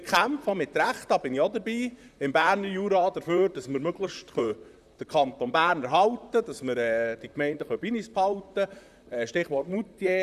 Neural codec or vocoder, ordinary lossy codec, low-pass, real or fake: vocoder, 44.1 kHz, 128 mel bands, Pupu-Vocoder; none; 14.4 kHz; fake